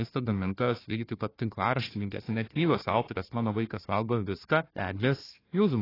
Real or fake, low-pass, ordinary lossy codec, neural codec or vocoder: fake; 5.4 kHz; AAC, 24 kbps; codec, 16 kHz, 1 kbps, FunCodec, trained on Chinese and English, 50 frames a second